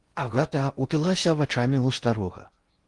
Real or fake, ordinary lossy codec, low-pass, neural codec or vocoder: fake; Opus, 24 kbps; 10.8 kHz; codec, 16 kHz in and 24 kHz out, 0.6 kbps, FocalCodec, streaming, 4096 codes